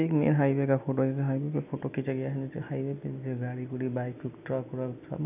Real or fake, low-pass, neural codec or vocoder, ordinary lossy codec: real; 3.6 kHz; none; none